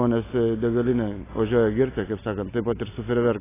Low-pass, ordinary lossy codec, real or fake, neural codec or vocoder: 3.6 kHz; AAC, 16 kbps; real; none